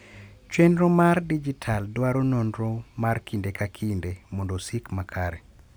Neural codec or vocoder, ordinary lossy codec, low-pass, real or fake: none; none; none; real